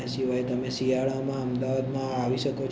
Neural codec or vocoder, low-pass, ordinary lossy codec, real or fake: none; none; none; real